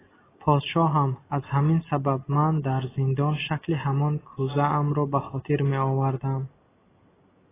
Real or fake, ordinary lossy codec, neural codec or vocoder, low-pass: real; AAC, 16 kbps; none; 3.6 kHz